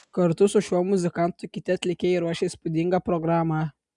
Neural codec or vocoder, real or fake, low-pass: none; real; 10.8 kHz